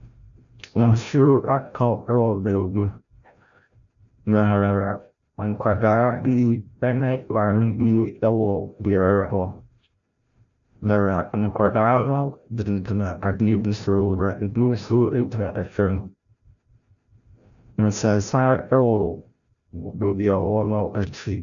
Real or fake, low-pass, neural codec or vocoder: fake; 7.2 kHz; codec, 16 kHz, 0.5 kbps, FreqCodec, larger model